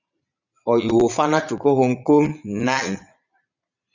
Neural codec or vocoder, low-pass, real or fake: vocoder, 22.05 kHz, 80 mel bands, Vocos; 7.2 kHz; fake